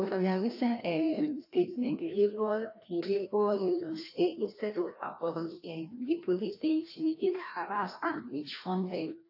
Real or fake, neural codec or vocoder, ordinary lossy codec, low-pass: fake; codec, 16 kHz, 1 kbps, FreqCodec, larger model; AAC, 32 kbps; 5.4 kHz